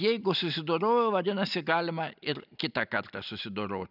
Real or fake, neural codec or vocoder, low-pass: real; none; 5.4 kHz